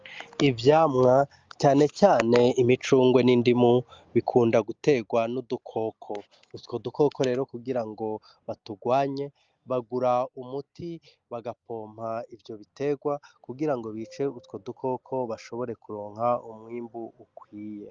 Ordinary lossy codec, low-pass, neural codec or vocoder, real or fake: Opus, 32 kbps; 7.2 kHz; none; real